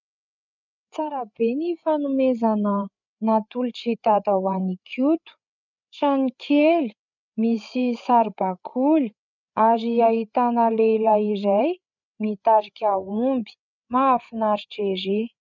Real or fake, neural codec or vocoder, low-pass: fake; codec, 16 kHz, 8 kbps, FreqCodec, larger model; 7.2 kHz